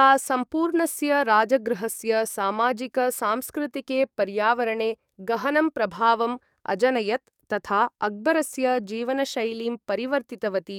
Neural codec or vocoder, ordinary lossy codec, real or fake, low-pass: codec, 44.1 kHz, 7.8 kbps, DAC; none; fake; 19.8 kHz